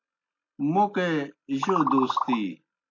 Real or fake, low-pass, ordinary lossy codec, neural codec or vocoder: real; 7.2 kHz; AAC, 48 kbps; none